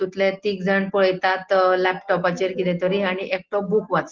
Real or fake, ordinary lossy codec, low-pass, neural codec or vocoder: real; Opus, 16 kbps; 7.2 kHz; none